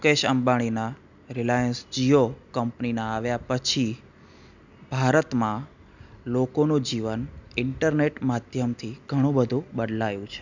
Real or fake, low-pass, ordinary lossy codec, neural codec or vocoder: real; 7.2 kHz; none; none